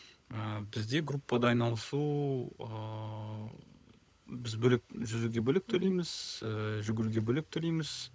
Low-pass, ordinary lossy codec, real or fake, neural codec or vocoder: none; none; fake; codec, 16 kHz, 16 kbps, FunCodec, trained on LibriTTS, 50 frames a second